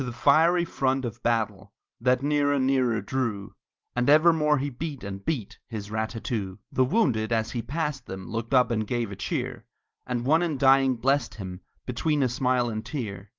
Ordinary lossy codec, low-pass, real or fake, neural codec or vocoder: Opus, 32 kbps; 7.2 kHz; real; none